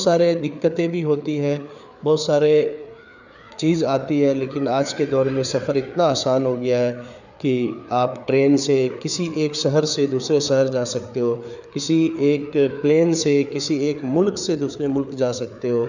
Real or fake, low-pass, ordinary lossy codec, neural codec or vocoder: fake; 7.2 kHz; none; codec, 16 kHz, 4 kbps, FreqCodec, larger model